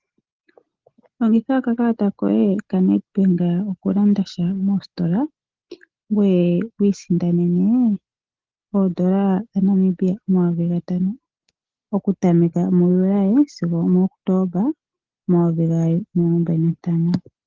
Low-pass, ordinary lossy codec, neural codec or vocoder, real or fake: 7.2 kHz; Opus, 16 kbps; none; real